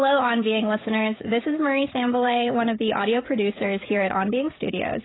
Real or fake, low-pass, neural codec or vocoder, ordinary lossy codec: fake; 7.2 kHz; codec, 16 kHz, 16 kbps, FreqCodec, smaller model; AAC, 16 kbps